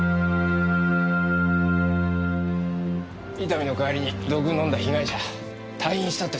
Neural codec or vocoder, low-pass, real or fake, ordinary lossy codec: none; none; real; none